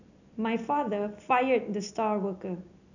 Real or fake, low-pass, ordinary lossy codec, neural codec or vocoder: real; 7.2 kHz; none; none